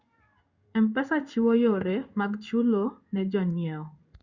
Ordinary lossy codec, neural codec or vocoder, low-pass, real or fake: Opus, 64 kbps; codec, 16 kHz in and 24 kHz out, 1 kbps, XY-Tokenizer; 7.2 kHz; fake